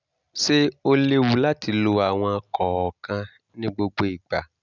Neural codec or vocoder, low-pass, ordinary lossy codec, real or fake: none; 7.2 kHz; none; real